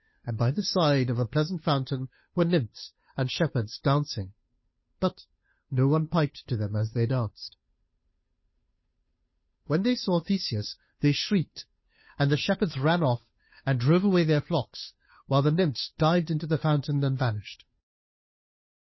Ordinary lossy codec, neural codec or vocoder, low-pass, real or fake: MP3, 24 kbps; codec, 16 kHz, 2 kbps, FunCodec, trained on Chinese and English, 25 frames a second; 7.2 kHz; fake